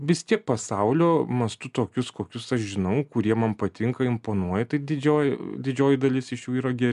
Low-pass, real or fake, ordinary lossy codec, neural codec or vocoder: 10.8 kHz; real; AAC, 96 kbps; none